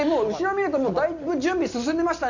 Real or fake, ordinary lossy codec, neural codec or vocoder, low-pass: real; none; none; 7.2 kHz